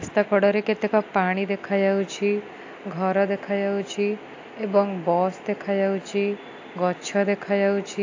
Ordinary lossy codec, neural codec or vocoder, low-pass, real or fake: AAC, 48 kbps; none; 7.2 kHz; real